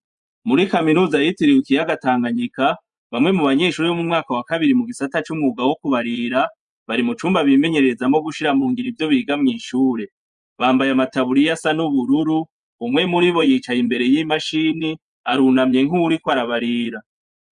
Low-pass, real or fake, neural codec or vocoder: 10.8 kHz; fake; vocoder, 24 kHz, 100 mel bands, Vocos